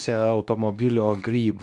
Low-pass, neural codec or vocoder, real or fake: 10.8 kHz; codec, 24 kHz, 0.9 kbps, WavTokenizer, medium speech release version 2; fake